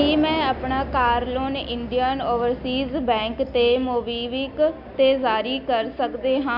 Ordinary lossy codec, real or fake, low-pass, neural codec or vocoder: none; real; 5.4 kHz; none